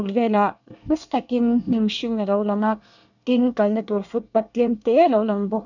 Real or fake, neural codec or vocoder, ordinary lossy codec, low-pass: fake; codec, 24 kHz, 1 kbps, SNAC; none; 7.2 kHz